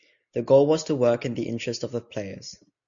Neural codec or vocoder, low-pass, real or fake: none; 7.2 kHz; real